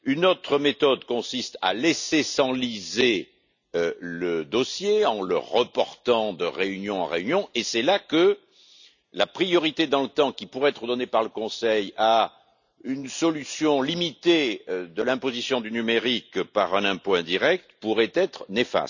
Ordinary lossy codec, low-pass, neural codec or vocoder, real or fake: none; 7.2 kHz; none; real